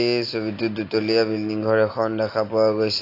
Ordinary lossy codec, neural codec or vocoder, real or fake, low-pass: AAC, 32 kbps; none; real; 5.4 kHz